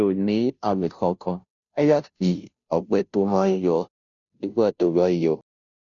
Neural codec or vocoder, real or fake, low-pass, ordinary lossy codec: codec, 16 kHz, 0.5 kbps, FunCodec, trained on Chinese and English, 25 frames a second; fake; 7.2 kHz; none